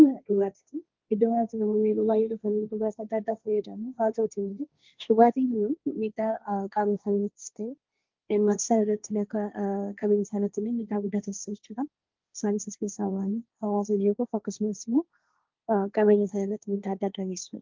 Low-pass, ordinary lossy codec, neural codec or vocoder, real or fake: 7.2 kHz; Opus, 24 kbps; codec, 16 kHz, 1.1 kbps, Voila-Tokenizer; fake